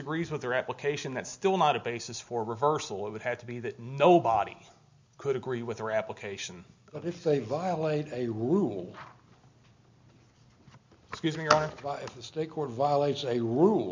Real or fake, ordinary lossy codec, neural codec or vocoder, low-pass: real; MP3, 64 kbps; none; 7.2 kHz